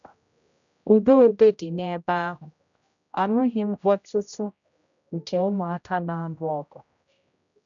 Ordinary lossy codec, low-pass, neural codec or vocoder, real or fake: none; 7.2 kHz; codec, 16 kHz, 0.5 kbps, X-Codec, HuBERT features, trained on general audio; fake